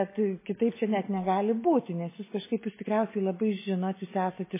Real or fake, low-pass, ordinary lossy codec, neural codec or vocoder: real; 3.6 kHz; MP3, 16 kbps; none